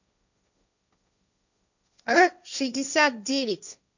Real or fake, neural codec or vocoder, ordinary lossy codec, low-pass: fake; codec, 16 kHz, 1.1 kbps, Voila-Tokenizer; none; 7.2 kHz